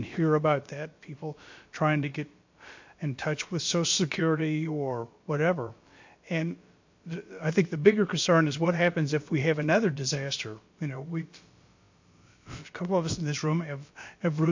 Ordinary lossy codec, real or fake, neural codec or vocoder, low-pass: MP3, 48 kbps; fake; codec, 16 kHz, about 1 kbps, DyCAST, with the encoder's durations; 7.2 kHz